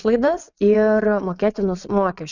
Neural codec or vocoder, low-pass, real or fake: vocoder, 22.05 kHz, 80 mel bands, WaveNeXt; 7.2 kHz; fake